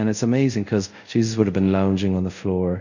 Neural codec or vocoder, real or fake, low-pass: codec, 24 kHz, 0.5 kbps, DualCodec; fake; 7.2 kHz